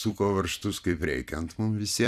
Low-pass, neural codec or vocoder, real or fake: 14.4 kHz; vocoder, 44.1 kHz, 128 mel bands every 256 samples, BigVGAN v2; fake